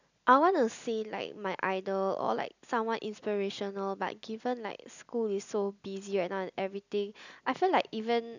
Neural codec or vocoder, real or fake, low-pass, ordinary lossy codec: none; real; 7.2 kHz; none